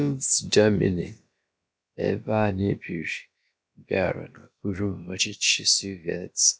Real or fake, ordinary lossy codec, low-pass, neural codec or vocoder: fake; none; none; codec, 16 kHz, about 1 kbps, DyCAST, with the encoder's durations